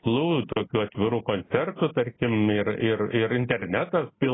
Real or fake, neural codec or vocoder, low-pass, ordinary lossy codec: real; none; 7.2 kHz; AAC, 16 kbps